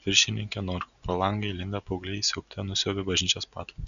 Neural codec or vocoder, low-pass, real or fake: none; 7.2 kHz; real